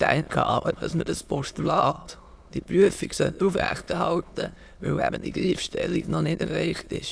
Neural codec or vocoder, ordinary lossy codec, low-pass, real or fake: autoencoder, 22.05 kHz, a latent of 192 numbers a frame, VITS, trained on many speakers; none; none; fake